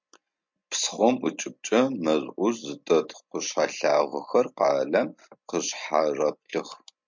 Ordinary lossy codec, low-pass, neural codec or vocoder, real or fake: MP3, 64 kbps; 7.2 kHz; none; real